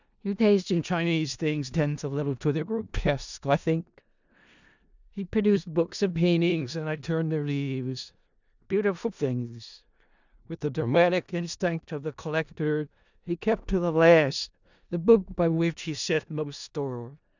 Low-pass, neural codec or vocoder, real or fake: 7.2 kHz; codec, 16 kHz in and 24 kHz out, 0.4 kbps, LongCat-Audio-Codec, four codebook decoder; fake